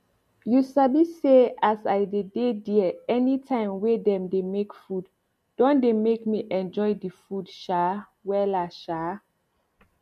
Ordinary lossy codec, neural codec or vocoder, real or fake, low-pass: MP3, 64 kbps; none; real; 14.4 kHz